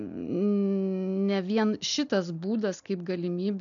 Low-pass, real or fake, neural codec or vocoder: 7.2 kHz; real; none